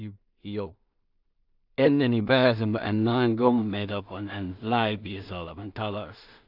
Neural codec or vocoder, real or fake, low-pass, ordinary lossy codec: codec, 16 kHz in and 24 kHz out, 0.4 kbps, LongCat-Audio-Codec, two codebook decoder; fake; 5.4 kHz; none